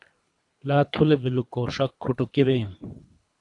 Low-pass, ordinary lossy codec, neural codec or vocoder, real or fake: 10.8 kHz; AAC, 64 kbps; codec, 24 kHz, 3 kbps, HILCodec; fake